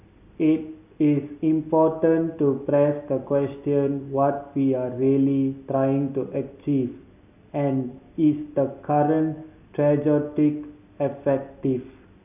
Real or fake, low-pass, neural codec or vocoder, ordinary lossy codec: real; 3.6 kHz; none; none